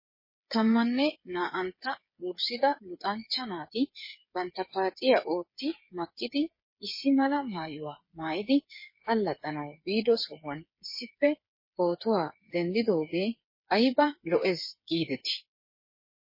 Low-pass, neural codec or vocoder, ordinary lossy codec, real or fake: 5.4 kHz; codec, 16 kHz, 8 kbps, FreqCodec, smaller model; MP3, 24 kbps; fake